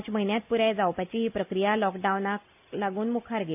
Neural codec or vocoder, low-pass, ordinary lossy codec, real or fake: none; 3.6 kHz; AAC, 32 kbps; real